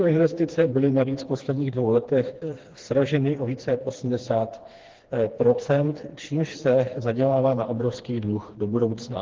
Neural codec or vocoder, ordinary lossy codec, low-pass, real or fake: codec, 16 kHz, 2 kbps, FreqCodec, smaller model; Opus, 16 kbps; 7.2 kHz; fake